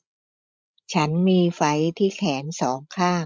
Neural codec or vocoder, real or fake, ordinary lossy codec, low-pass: codec, 16 kHz, 16 kbps, FreqCodec, larger model; fake; none; none